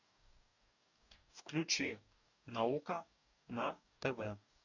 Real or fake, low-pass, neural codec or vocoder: fake; 7.2 kHz; codec, 44.1 kHz, 2.6 kbps, DAC